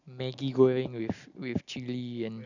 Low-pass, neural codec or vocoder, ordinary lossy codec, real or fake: 7.2 kHz; none; none; real